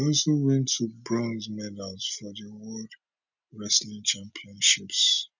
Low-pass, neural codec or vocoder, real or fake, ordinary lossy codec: 7.2 kHz; none; real; none